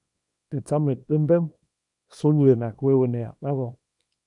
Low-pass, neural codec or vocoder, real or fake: 10.8 kHz; codec, 24 kHz, 0.9 kbps, WavTokenizer, small release; fake